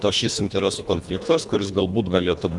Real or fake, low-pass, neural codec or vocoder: fake; 10.8 kHz; codec, 24 kHz, 1.5 kbps, HILCodec